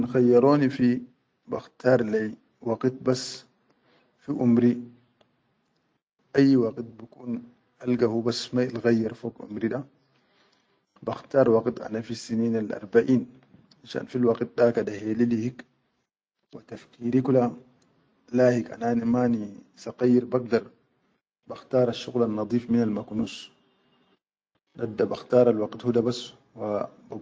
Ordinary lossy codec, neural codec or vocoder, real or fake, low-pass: none; none; real; none